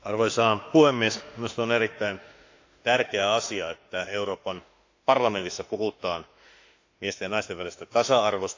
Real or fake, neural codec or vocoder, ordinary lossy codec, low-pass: fake; autoencoder, 48 kHz, 32 numbers a frame, DAC-VAE, trained on Japanese speech; AAC, 48 kbps; 7.2 kHz